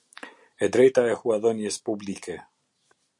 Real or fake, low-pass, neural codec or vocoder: real; 10.8 kHz; none